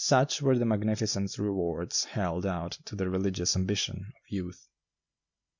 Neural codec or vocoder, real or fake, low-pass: none; real; 7.2 kHz